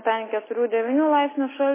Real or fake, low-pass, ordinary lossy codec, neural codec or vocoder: real; 3.6 kHz; MP3, 16 kbps; none